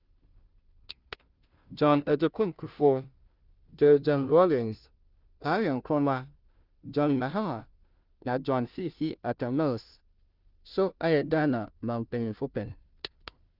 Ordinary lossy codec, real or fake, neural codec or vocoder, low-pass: Opus, 24 kbps; fake; codec, 16 kHz, 0.5 kbps, FunCodec, trained on Chinese and English, 25 frames a second; 5.4 kHz